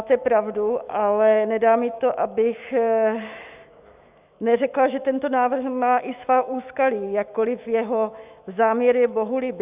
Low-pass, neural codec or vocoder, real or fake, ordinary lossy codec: 3.6 kHz; autoencoder, 48 kHz, 128 numbers a frame, DAC-VAE, trained on Japanese speech; fake; Opus, 64 kbps